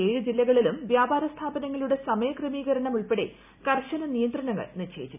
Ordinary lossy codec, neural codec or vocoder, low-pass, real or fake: none; none; 3.6 kHz; real